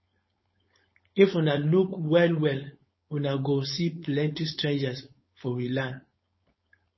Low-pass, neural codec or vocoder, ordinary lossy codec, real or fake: 7.2 kHz; codec, 16 kHz, 4.8 kbps, FACodec; MP3, 24 kbps; fake